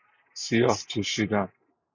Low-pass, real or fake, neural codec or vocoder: 7.2 kHz; real; none